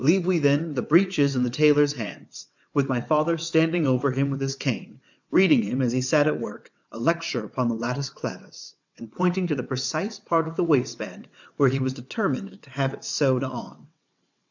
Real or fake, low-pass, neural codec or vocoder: fake; 7.2 kHz; vocoder, 22.05 kHz, 80 mel bands, WaveNeXt